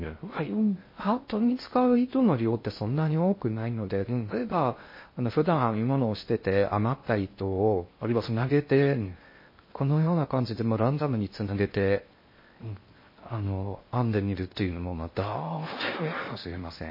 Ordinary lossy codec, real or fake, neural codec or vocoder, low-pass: MP3, 24 kbps; fake; codec, 16 kHz in and 24 kHz out, 0.6 kbps, FocalCodec, streaming, 2048 codes; 5.4 kHz